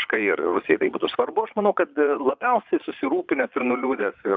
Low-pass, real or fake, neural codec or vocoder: 7.2 kHz; fake; vocoder, 22.05 kHz, 80 mel bands, Vocos